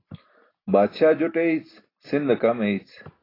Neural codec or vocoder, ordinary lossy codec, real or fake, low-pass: none; AAC, 24 kbps; real; 5.4 kHz